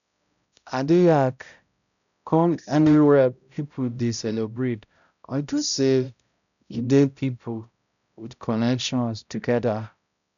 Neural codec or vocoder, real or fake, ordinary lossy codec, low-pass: codec, 16 kHz, 0.5 kbps, X-Codec, HuBERT features, trained on balanced general audio; fake; none; 7.2 kHz